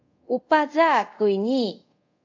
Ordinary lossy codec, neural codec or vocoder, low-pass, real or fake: AAC, 48 kbps; codec, 24 kHz, 0.5 kbps, DualCodec; 7.2 kHz; fake